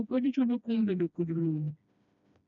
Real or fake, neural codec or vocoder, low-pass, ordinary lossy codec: fake; codec, 16 kHz, 1 kbps, FreqCodec, smaller model; 7.2 kHz; none